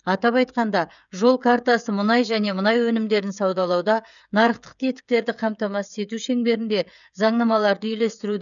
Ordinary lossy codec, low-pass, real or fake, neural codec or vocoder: none; 7.2 kHz; fake; codec, 16 kHz, 16 kbps, FreqCodec, smaller model